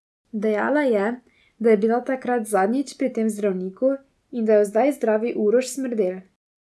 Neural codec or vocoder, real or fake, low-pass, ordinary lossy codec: none; real; none; none